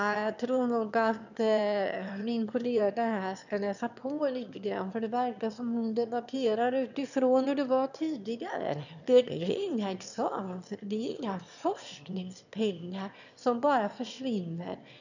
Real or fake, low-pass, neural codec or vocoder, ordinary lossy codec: fake; 7.2 kHz; autoencoder, 22.05 kHz, a latent of 192 numbers a frame, VITS, trained on one speaker; none